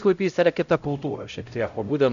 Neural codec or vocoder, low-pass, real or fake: codec, 16 kHz, 0.5 kbps, X-Codec, HuBERT features, trained on LibriSpeech; 7.2 kHz; fake